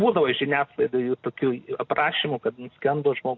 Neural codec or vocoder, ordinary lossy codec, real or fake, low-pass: none; AAC, 48 kbps; real; 7.2 kHz